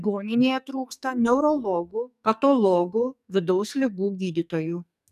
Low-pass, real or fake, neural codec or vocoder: 14.4 kHz; fake; codec, 44.1 kHz, 2.6 kbps, SNAC